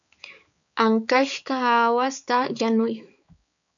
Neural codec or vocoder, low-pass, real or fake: codec, 16 kHz, 4 kbps, X-Codec, HuBERT features, trained on balanced general audio; 7.2 kHz; fake